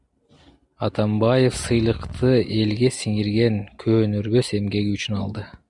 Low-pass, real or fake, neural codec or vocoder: 10.8 kHz; real; none